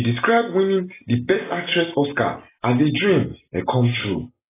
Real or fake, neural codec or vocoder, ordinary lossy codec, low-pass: real; none; AAC, 16 kbps; 3.6 kHz